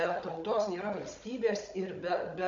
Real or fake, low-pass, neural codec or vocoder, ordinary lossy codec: fake; 7.2 kHz; codec, 16 kHz, 16 kbps, FunCodec, trained on Chinese and English, 50 frames a second; MP3, 48 kbps